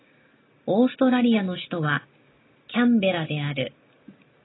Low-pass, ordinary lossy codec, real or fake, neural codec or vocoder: 7.2 kHz; AAC, 16 kbps; real; none